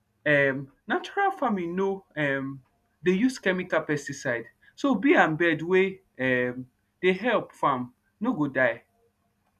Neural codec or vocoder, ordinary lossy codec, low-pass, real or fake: none; none; 14.4 kHz; real